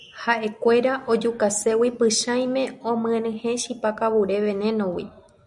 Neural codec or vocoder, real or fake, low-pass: none; real; 10.8 kHz